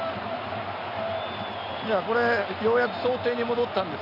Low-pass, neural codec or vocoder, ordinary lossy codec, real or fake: 5.4 kHz; none; none; real